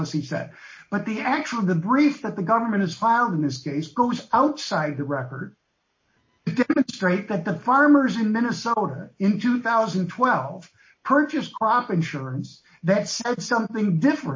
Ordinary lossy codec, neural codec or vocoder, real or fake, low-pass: MP3, 32 kbps; none; real; 7.2 kHz